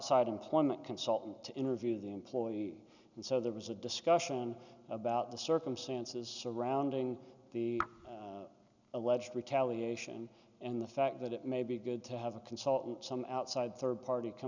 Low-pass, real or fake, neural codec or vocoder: 7.2 kHz; real; none